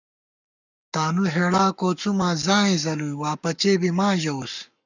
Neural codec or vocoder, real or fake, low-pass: codec, 44.1 kHz, 7.8 kbps, Pupu-Codec; fake; 7.2 kHz